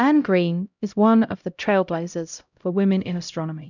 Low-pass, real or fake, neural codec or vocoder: 7.2 kHz; fake; codec, 16 kHz, 0.5 kbps, X-Codec, HuBERT features, trained on LibriSpeech